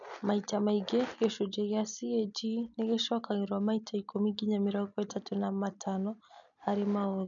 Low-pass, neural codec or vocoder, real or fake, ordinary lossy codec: 7.2 kHz; none; real; none